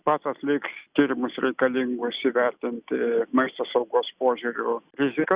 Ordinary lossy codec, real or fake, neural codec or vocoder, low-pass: Opus, 64 kbps; real; none; 3.6 kHz